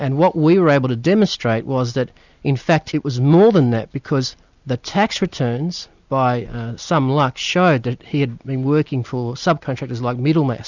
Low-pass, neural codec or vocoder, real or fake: 7.2 kHz; none; real